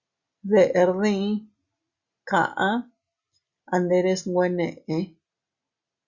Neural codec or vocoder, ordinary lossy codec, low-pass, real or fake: none; Opus, 64 kbps; 7.2 kHz; real